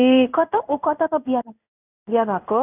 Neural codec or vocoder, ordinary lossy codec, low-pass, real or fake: codec, 16 kHz in and 24 kHz out, 1 kbps, XY-Tokenizer; none; 3.6 kHz; fake